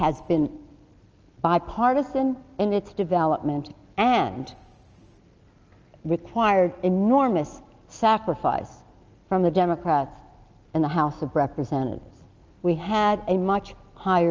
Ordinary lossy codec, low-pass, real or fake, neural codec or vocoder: Opus, 24 kbps; 7.2 kHz; real; none